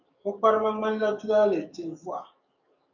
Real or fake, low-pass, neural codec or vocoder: fake; 7.2 kHz; codec, 44.1 kHz, 7.8 kbps, DAC